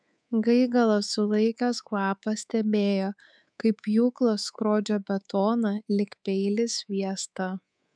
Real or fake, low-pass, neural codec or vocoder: fake; 9.9 kHz; codec, 24 kHz, 3.1 kbps, DualCodec